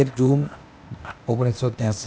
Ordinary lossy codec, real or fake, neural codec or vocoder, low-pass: none; fake; codec, 16 kHz, 0.8 kbps, ZipCodec; none